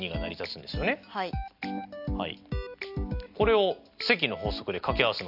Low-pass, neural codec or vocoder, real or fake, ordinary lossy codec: 5.4 kHz; none; real; AAC, 48 kbps